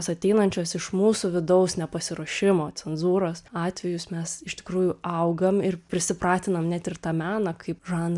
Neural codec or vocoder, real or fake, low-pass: none; real; 10.8 kHz